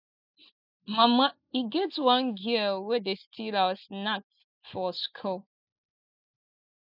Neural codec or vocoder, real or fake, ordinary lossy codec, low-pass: none; real; none; 5.4 kHz